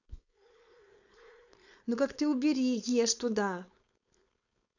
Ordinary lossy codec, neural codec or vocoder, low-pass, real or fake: none; codec, 16 kHz, 4.8 kbps, FACodec; 7.2 kHz; fake